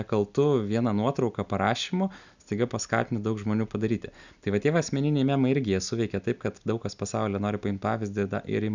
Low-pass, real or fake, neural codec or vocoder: 7.2 kHz; real; none